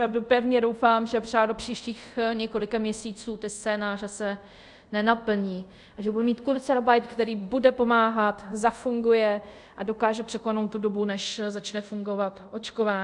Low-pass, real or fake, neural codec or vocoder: 10.8 kHz; fake; codec, 24 kHz, 0.5 kbps, DualCodec